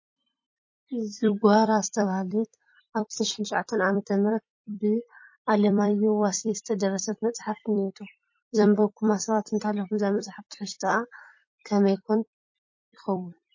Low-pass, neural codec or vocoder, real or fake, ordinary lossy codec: 7.2 kHz; vocoder, 44.1 kHz, 128 mel bands every 256 samples, BigVGAN v2; fake; MP3, 32 kbps